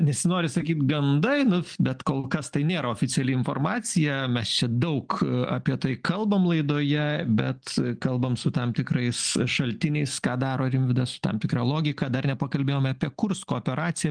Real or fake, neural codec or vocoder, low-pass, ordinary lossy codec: real; none; 9.9 kHz; Opus, 24 kbps